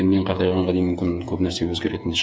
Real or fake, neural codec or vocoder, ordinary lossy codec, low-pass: fake; codec, 16 kHz, 8 kbps, FreqCodec, smaller model; none; none